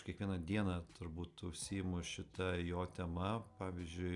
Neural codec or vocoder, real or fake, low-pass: none; real; 10.8 kHz